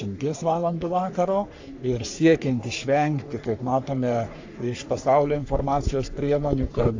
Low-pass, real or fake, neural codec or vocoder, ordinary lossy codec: 7.2 kHz; fake; codec, 44.1 kHz, 3.4 kbps, Pupu-Codec; AAC, 48 kbps